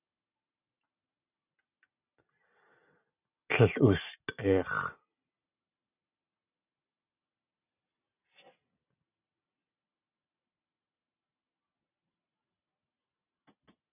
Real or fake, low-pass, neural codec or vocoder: real; 3.6 kHz; none